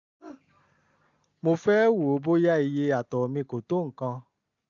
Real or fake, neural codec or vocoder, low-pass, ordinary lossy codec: real; none; 7.2 kHz; none